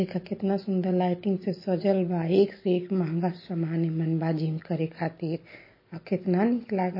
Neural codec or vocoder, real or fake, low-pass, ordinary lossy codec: none; real; 5.4 kHz; MP3, 24 kbps